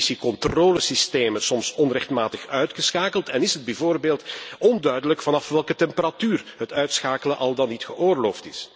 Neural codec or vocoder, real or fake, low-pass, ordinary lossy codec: none; real; none; none